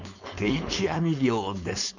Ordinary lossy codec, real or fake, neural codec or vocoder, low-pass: none; fake; codec, 16 kHz, 4.8 kbps, FACodec; 7.2 kHz